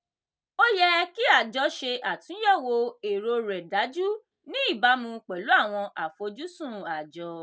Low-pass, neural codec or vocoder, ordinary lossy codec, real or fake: none; none; none; real